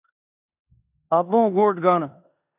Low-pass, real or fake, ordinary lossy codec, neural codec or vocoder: 3.6 kHz; fake; AAC, 32 kbps; codec, 16 kHz in and 24 kHz out, 0.9 kbps, LongCat-Audio-Codec, four codebook decoder